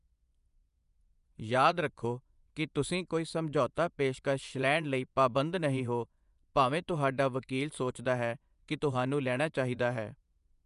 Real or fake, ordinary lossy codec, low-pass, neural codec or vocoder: fake; none; 10.8 kHz; vocoder, 24 kHz, 100 mel bands, Vocos